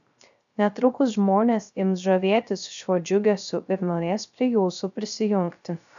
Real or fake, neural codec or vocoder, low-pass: fake; codec, 16 kHz, 0.3 kbps, FocalCodec; 7.2 kHz